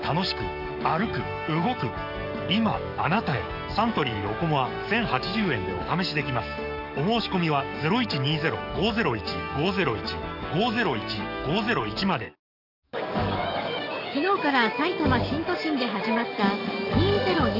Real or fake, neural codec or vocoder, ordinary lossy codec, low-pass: fake; codec, 44.1 kHz, 7.8 kbps, DAC; none; 5.4 kHz